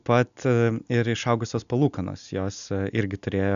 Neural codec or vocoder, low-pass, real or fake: none; 7.2 kHz; real